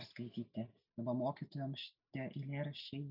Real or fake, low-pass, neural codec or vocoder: real; 5.4 kHz; none